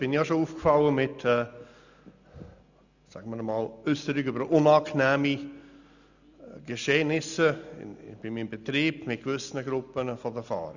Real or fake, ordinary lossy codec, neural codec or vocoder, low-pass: real; none; none; 7.2 kHz